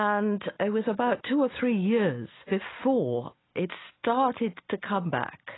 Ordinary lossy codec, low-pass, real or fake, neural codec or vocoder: AAC, 16 kbps; 7.2 kHz; real; none